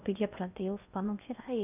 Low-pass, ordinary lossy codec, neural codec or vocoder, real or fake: 3.6 kHz; none; codec, 16 kHz in and 24 kHz out, 0.6 kbps, FocalCodec, streaming, 4096 codes; fake